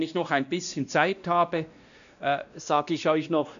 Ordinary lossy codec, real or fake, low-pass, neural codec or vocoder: none; fake; 7.2 kHz; codec, 16 kHz, 1 kbps, X-Codec, WavLM features, trained on Multilingual LibriSpeech